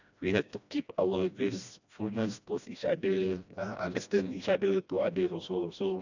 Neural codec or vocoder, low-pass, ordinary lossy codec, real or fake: codec, 16 kHz, 1 kbps, FreqCodec, smaller model; 7.2 kHz; none; fake